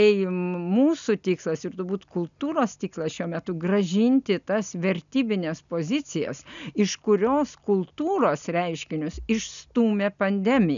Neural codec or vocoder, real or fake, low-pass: none; real; 7.2 kHz